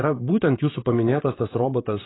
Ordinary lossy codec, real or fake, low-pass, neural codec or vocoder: AAC, 16 kbps; fake; 7.2 kHz; vocoder, 44.1 kHz, 128 mel bands, Pupu-Vocoder